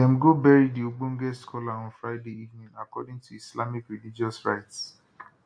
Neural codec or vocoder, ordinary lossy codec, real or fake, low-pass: none; AAC, 64 kbps; real; 9.9 kHz